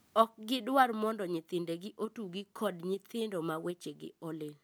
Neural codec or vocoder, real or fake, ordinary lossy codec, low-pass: none; real; none; none